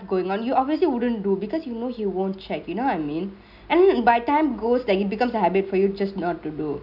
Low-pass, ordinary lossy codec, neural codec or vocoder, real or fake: 5.4 kHz; none; none; real